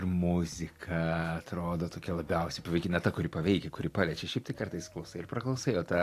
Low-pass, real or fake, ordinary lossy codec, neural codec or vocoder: 14.4 kHz; real; AAC, 64 kbps; none